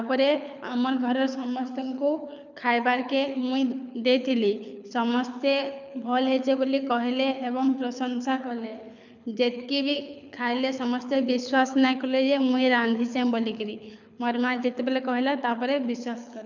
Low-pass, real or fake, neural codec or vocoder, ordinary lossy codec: 7.2 kHz; fake; codec, 24 kHz, 6 kbps, HILCodec; none